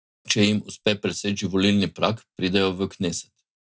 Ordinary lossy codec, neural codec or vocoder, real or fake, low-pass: none; none; real; none